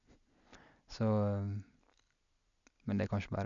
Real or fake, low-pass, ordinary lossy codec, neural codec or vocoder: real; 7.2 kHz; none; none